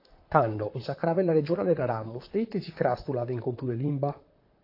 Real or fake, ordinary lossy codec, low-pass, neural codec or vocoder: fake; AAC, 32 kbps; 5.4 kHz; vocoder, 44.1 kHz, 128 mel bands, Pupu-Vocoder